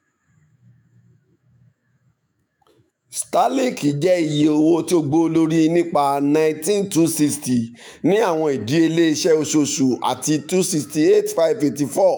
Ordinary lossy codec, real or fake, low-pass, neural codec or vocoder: none; fake; none; autoencoder, 48 kHz, 128 numbers a frame, DAC-VAE, trained on Japanese speech